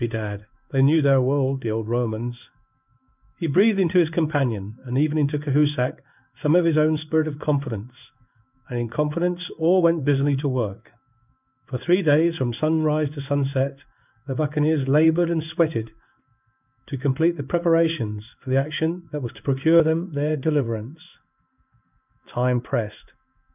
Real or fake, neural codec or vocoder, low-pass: fake; codec, 16 kHz in and 24 kHz out, 1 kbps, XY-Tokenizer; 3.6 kHz